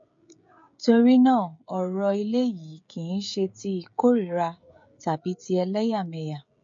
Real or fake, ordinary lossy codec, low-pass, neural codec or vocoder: fake; MP3, 48 kbps; 7.2 kHz; codec, 16 kHz, 16 kbps, FreqCodec, smaller model